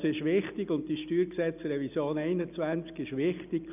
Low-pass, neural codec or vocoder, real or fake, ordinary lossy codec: 3.6 kHz; none; real; none